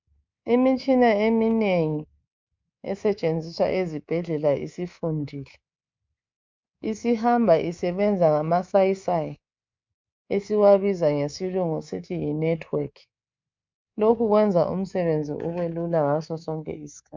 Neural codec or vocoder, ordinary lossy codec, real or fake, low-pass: codec, 24 kHz, 3.1 kbps, DualCodec; MP3, 64 kbps; fake; 7.2 kHz